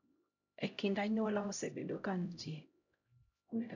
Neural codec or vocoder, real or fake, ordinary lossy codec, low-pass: codec, 16 kHz, 0.5 kbps, X-Codec, HuBERT features, trained on LibriSpeech; fake; none; 7.2 kHz